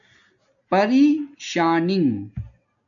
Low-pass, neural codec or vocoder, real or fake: 7.2 kHz; none; real